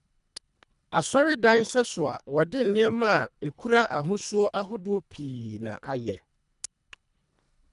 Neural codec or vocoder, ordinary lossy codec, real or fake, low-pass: codec, 24 kHz, 1.5 kbps, HILCodec; none; fake; 10.8 kHz